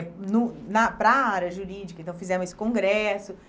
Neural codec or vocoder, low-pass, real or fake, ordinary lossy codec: none; none; real; none